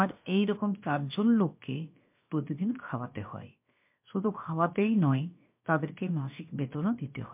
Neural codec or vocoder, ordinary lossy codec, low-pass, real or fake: codec, 16 kHz, about 1 kbps, DyCAST, with the encoder's durations; MP3, 32 kbps; 3.6 kHz; fake